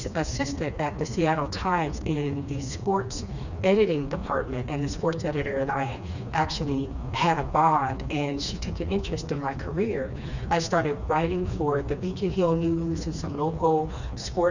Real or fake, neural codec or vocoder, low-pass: fake; codec, 16 kHz, 2 kbps, FreqCodec, smaller model; 7.2 kHz